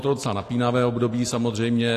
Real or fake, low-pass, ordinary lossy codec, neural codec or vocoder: real; 14.4 kHz; AAC, 48 kbps; none